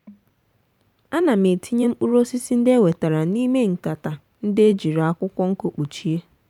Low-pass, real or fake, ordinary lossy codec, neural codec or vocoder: 19.8 kHz; fake; none; vocoder, 44.1 kHz, 128 mel bands every 512 samples, BigVGAN v2